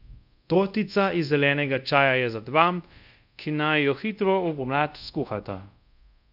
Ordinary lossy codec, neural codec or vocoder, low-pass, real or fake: none; codec, 24 kHz, 0.5 kbps, DualCodec; 5.4 kHz; fake